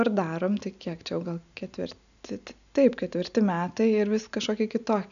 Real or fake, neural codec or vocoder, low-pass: real; none; 7.2 kHz